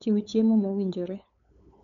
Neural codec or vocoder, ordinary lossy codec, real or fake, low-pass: codec, 16 kHz, 8 kbps, FunCodec, trained on LibriTTS, 25 frames a second; none; fake; 7.2 kHz